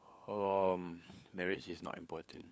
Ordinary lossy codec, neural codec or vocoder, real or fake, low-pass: none; codec, 16 kHz, 4 kbps, FunCodec, trained on LibriTTS, 50 frames a second; fake; none